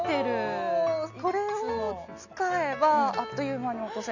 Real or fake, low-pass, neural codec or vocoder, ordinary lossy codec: real; 7.2 kHz; none; none